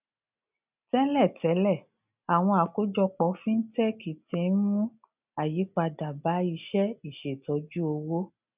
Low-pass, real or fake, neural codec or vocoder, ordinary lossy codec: 3.6 kHz; real; none; none